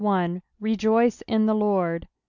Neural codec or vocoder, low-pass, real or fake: none; 7.2 kHz; real